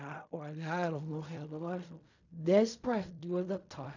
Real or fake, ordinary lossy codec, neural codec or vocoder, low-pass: fake; none; codec, 16 kHz in and 24 kHz out, 0.4 kbps, LongCat-Audio-Codec, fine tuned four codebook decoder; 7.2 kHz